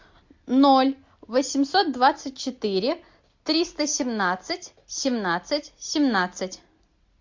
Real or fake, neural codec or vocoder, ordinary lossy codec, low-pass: real; none; MP3, 48 kbps; 7.2 kHz